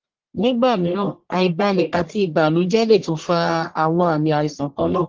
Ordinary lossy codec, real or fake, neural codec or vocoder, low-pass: Opus, 16 kbps; fake; codec, 44.1 kHz, 1.7 kbps, Pupu-Codec; 7.2 kHz